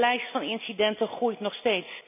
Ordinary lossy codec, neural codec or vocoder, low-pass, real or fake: none; none; 3.6 kHz; real